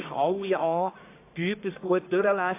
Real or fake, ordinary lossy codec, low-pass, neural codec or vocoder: fake; AAC, 24 kbps; 3.6 kHz; codec, 44.1 kHz, 1.7 kbps, Pupu-Codec